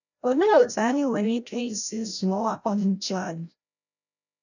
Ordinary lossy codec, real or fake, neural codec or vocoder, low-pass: none; fake; codec, 16 kHz, 0.5 kbps, FreqCodec, larger model; 7.2 kHz